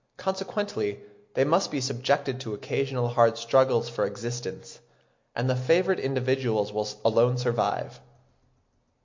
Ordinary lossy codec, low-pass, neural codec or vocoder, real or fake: MP3, 48 kbps; 7.2 kHz; none; real